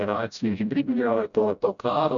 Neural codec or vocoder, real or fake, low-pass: codec, 16 kHz, 0.5 kbps, FreqCodec, smaller model; fake; 7.2 kHz